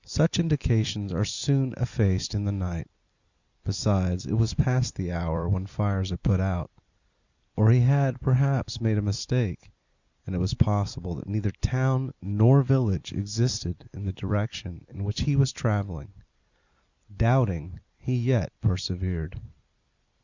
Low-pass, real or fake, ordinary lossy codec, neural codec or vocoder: 7.2 kHz; fake; Opus, 64 kbps; vocoder, 44.1 kHz, 128 mel bands every 256 samples, BigVGAN v2